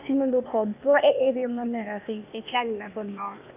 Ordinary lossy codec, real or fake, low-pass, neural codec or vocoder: none; fake; 3.6 kHz; codec, 16 kHz, 0.8 kbps, ZipCodec